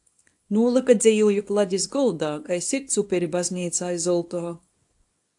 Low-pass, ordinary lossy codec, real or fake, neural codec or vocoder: 10.8 kHz; AAC, 64 kbps; fake; codec, 24 kHz, 0.9 kbps, WavTokenizer, small release